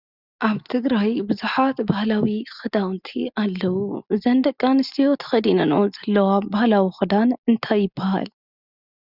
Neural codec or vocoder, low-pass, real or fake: none; 5.4 kHz; real